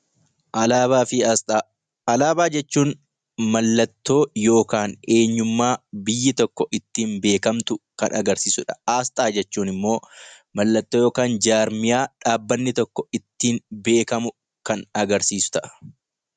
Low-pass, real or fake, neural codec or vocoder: 9.9 kHz; real; none